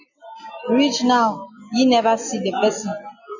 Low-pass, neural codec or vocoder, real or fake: 7.2 kHz; none; real